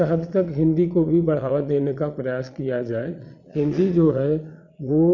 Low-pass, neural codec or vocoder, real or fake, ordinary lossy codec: 7.2 kHz; vocoder, 22.05 kHz, 80 mel bands, Vocos; fake; none